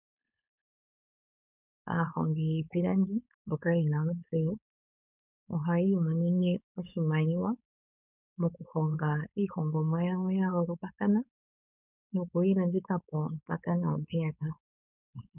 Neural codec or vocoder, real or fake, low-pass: codec, 16 kHz, 4.8 kbps, FACodec; fake; 3.6 kHz